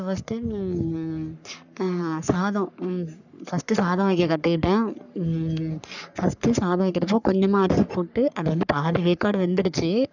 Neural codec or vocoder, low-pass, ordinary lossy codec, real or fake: codec, 44.1 kHz, 3.4 kbps, Pupu-Codec; 7.2 kHz; none; fake